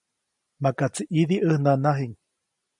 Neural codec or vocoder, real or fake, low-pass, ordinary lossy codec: none; real; 10.8 kHz; MP3, 96 kbps